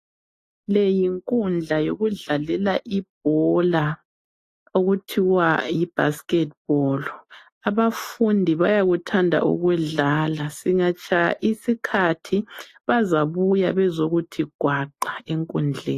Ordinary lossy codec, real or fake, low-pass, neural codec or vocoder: AAC, 48 kbps; real; 14.4 kHz; none